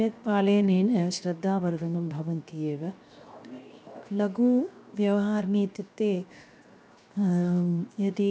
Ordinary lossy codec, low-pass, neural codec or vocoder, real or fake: none; none; codec, 16 kHz, 0.7 kbps, FocalCodec; fake